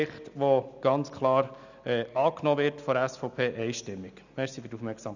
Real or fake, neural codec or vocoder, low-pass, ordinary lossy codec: real; none; 7.2 kHz; none